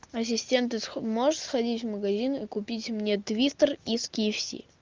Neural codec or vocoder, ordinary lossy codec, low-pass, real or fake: none; Opus, 24 kbps; 7.2 kHz; real